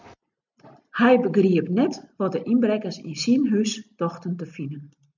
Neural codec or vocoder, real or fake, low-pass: none; real; 7.2 kHz